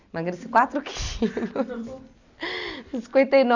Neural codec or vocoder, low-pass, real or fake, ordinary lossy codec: none; 7.2 kHz; real; none